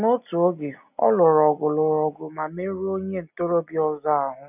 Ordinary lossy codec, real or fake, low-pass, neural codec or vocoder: none; real; 3.6 kHz; none